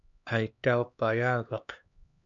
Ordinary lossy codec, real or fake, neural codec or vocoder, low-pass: MP3, 64 kbps; fake; codec, 16 kHz, 2 kbps, X-Codec, HuBERT features, trained on LibriSpeech; 7.2 kHz